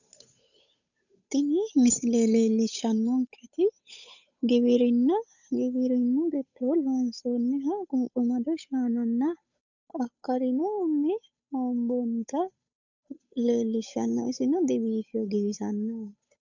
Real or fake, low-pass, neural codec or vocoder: fake; 7.2 kHz; codec, 16 kHz, 8 kbps, FunCodec, trained on Chinese and English, 25 frames a second